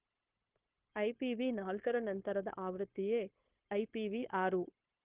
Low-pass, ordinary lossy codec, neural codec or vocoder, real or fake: 3.6 kHz; Opus, 16 kbps; codec, 16 kHz, 0.9 kbps, LongCat-Audio-Codec; fake